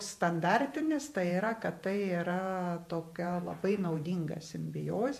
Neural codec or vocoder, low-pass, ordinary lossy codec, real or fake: vocoder, 48 kHz, 128 mel bands, Vocos; 14.4 kHz; MP3, 64 kbps; fake